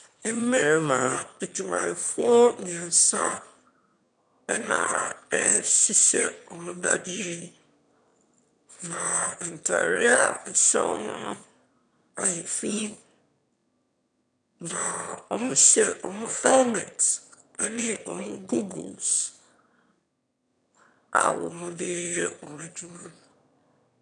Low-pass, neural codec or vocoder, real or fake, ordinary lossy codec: 9.9 kHz; autoencoder, 22.05 kHz, a latent of 192 numbers a frame, VITS, trained on one speaker; fake; MP3, 96 kbps